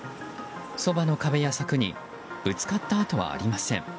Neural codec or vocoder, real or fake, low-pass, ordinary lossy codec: none; real; none; none